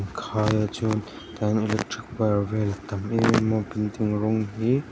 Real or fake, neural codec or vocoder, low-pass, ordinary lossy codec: real; none; none; none